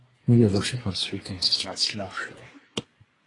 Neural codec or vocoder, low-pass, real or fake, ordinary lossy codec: codec, 24 kHz, 1 kbps, SNAC; 10.8 kHz; fake; AAC, 32 kbps